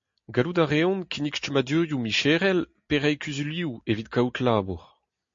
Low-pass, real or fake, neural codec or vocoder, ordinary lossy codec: 7.2 kHz; real; none; MP3, 48 kbps